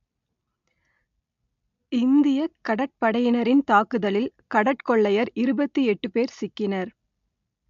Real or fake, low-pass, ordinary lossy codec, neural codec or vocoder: real; 7.2 kHz; AAC, 64 kbps; none